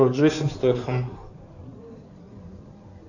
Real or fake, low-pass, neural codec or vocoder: fake; 7.2 kHz; codec, 16 kHz in and 24 kHz out, 2.2 kbps, FireRedTTS-2 codec